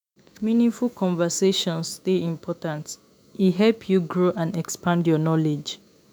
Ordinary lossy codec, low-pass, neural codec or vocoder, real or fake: none; none; autoencoder, 48 kHz, 128 numbers a frame, DAC-VAE, trained on Japanese speech; fake